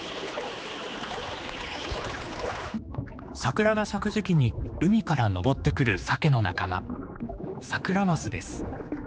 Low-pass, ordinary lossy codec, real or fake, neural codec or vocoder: none; none; fake; codec, 16 kHz, 2 kbps, X-Codec, HuBERT features, trained on general audio